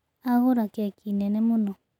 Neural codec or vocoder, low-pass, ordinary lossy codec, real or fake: none; 19.8 kHz; none; real